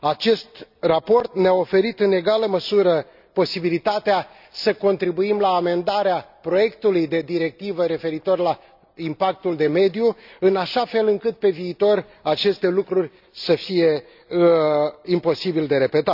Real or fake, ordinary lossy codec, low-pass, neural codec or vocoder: real; none; 5.4 kHz; none